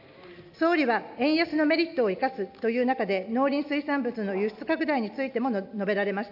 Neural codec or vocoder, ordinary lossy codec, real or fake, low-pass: none; AAC, 48 kbps; real; 5.4 kHz